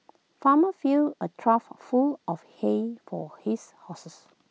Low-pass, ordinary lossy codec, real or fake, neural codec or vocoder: none; none; real; none